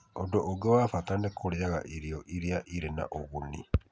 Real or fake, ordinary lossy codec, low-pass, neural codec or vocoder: real; none; none; none